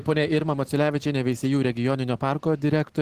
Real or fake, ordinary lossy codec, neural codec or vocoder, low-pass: real; Opus, 16 kbps; none; 14.4 kHz